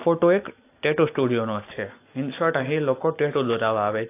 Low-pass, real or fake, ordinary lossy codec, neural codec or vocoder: 3.6 kHz; real; AAC, 24 kbps; none